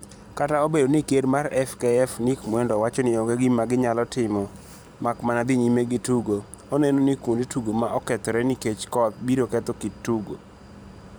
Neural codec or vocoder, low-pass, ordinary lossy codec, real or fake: vocoder, 44.1 kHz, 128 mel bands every 512 samples, BigVGAN v2; none; none; fake